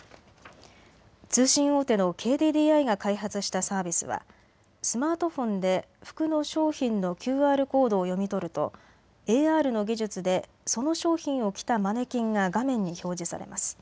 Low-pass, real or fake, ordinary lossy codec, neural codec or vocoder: none; real; none; none